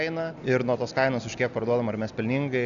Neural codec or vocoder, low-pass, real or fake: none; 7.2 kHz; real